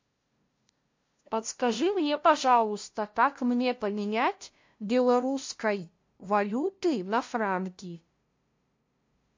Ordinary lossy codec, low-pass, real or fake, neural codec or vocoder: MP3, 48 kbps; 7.2 kHz; fake; codec, 16 kHz, 0.5 kbps, FunCodec, trained on LibriTTS, 25 frames a second